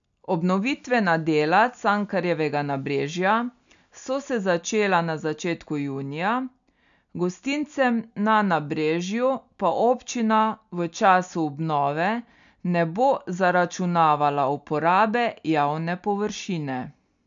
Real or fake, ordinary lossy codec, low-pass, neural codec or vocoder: real; none; 7.2 kHz; none